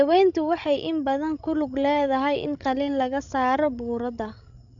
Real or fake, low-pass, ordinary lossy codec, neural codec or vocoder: fake; 7.2 kHz; none; codec, 16 kHz, 16 kbps, FreqCodec, larger model